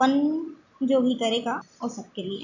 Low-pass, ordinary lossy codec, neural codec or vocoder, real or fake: 7.2 kHz; none; none; real